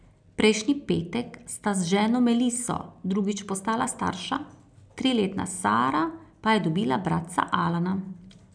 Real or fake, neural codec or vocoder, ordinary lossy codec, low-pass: real; none; none; 9.9 kHz